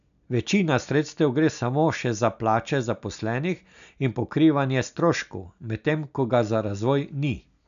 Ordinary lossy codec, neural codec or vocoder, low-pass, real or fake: none; none; 7.2 kHz; real